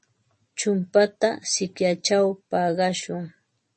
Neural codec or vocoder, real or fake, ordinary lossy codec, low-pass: none; real; MP3, 32 kbps; 10.8 kHz